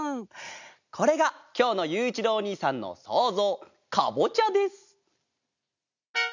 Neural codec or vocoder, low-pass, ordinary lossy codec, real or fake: none; 7.2 kHz; none; real